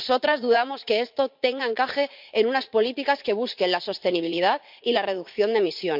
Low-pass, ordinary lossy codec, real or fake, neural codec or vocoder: 5.4 kHz; MP3, 48 kbps; fake; vocoder, 44.1 kHz, 80 mel bands, Vocos